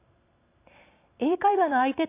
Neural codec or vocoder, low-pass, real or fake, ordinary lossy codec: vocoder, 44.1 kHz, 128 mel bands every 256 samples, BigVGAN v2; 3.6 kHz; fake; AAC, 24 kbps